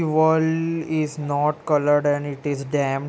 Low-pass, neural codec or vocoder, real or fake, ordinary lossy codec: none; none; real; none